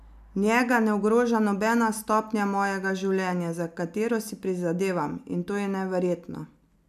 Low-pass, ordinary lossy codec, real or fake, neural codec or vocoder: 14.4 kHz; none; real; none